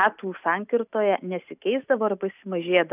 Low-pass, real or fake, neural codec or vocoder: 3.6 kHz; real; none